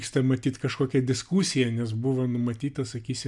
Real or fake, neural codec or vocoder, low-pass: real; none; 10.8 kHz